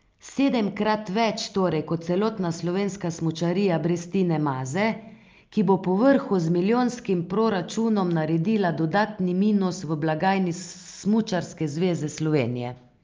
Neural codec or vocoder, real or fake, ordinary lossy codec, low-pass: none; real; Opus, 24 kbps; 7.2 kHz